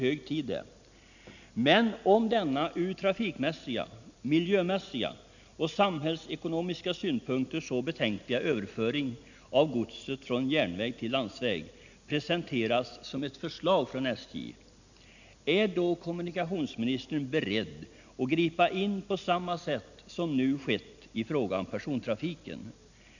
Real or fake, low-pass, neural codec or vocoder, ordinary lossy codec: real; 7.2 kHz; none; none